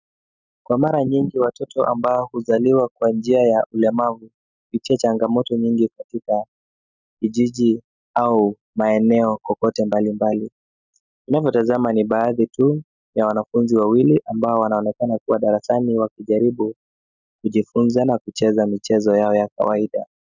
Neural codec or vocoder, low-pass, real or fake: none; 7.2 kHz; real